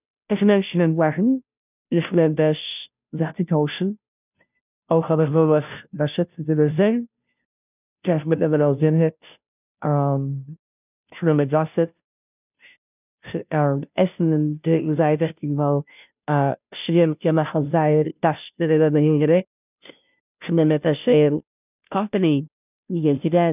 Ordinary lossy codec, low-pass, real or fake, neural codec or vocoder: none; 3.6 kHz; fake; codec, 16 kHz, 0.5 kbps, FunCodec, trained on Chinese and English, 25 frames a second